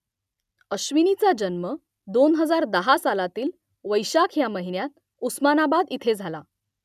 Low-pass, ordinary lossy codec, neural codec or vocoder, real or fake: 14.4 kHz; none; none; real